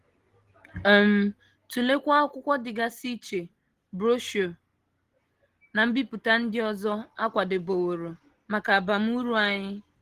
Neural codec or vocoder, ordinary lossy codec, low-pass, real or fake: none; Opus, 16 kbps; 14.4 kHz; real